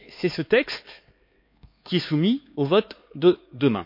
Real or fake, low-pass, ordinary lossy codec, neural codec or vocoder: fake; 5.4 kHz; MP3, 32 kbps; codec, 16 kHz, 4 kbps, X-Codec, HuBERT features, trained on LibriSpeech